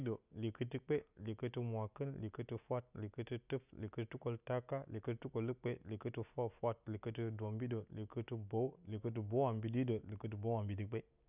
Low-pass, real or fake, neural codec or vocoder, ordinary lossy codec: 3.6 kHz; real; none; none